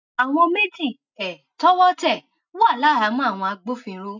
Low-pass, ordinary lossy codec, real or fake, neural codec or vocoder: 7.2 kHz; none; real; none